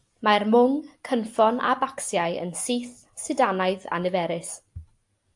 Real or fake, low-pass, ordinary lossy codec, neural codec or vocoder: real; 10.8 kHz; AAC, 64 kbps; none